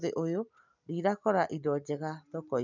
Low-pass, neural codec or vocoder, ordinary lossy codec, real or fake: 7.2 kHz; none; none; real